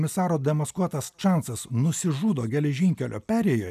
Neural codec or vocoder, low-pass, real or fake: none; 14.4 kHz; real